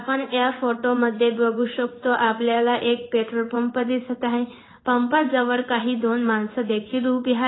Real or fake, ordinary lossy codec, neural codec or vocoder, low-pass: fake; AAC, 16 kbps; codec, 16 kHz in and 24 kHz out, 1 kbps, XY-Tokenizer; 7.2 kHz